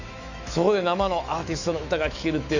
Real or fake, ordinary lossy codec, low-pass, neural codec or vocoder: fake; none; 7.2 kHz; autoencoder, 48 kHz, 128 numbers a frame, DAC-VAE, trained on Japanese speech